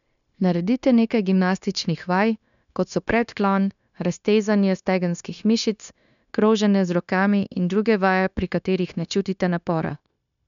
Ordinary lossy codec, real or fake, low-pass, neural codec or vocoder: none; fake; 7.2 kHz; codec, 16 kHz, 0.9 kbps, LongCat-Audio-Codec